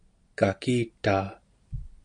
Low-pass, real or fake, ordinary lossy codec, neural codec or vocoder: 9.9 kHz; real; AAC, 64 kbps; none